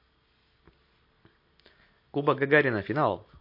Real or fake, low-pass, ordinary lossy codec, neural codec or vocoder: real; 5.4 kHz; MP3, 32 kbps; none